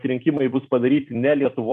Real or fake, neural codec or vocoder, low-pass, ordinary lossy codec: fake; vocoder, 44.1 kHz, 128 mel bands every 256 samples, BigVGAN v2; 14.4 kHz; MP3, 64 kbps